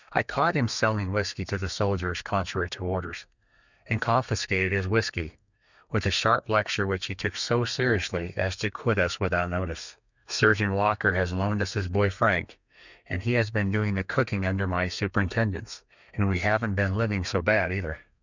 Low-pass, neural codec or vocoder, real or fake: 7.2 kHz; codec, 32 kHz, 1.9 kbps, SNAC; fake